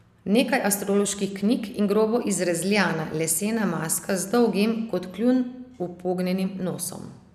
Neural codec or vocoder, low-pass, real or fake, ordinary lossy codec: none; 14.4 kHz; real; none